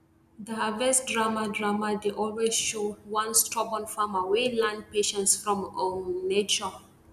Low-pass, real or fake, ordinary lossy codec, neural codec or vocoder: 14.4 kHz; real; none; none